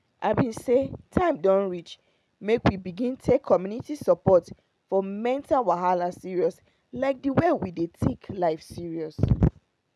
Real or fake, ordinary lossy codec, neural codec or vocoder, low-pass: real; none; none; none